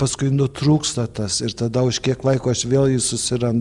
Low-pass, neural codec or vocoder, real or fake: 10.8 kHz; none; real